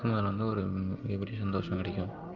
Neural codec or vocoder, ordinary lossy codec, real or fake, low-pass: none; Opus, 24 kbps; real; 7.2 kHz